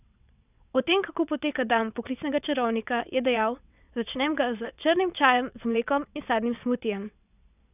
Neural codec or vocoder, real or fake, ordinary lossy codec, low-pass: vocoder, 22.05 kHz, 80 mel bands, WaveNeXt; fake; none; 3.6 kHz